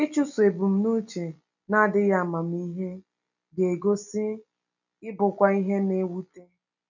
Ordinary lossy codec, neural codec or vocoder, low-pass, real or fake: none; none; 7.2 kHz; real